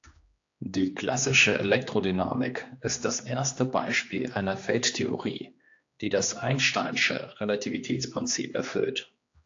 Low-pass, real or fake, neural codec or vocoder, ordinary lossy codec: 7.2 kHz; fake; codec, 16 kHz, 2 kbps, X-Codec, HuBERT features, trained on general audio; AAC, 48 kbps